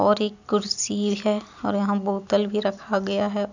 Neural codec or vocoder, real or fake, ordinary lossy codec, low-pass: none; real; none; 7.2 kHz